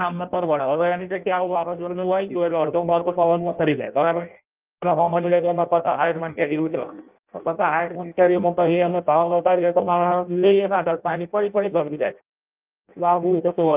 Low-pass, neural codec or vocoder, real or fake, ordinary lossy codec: 3.6 kHz; codec, 16 kHz in and 24 kHz out, 0.6 kbps, FireRedTTS-2 codec; fake; Opus, 24 kbps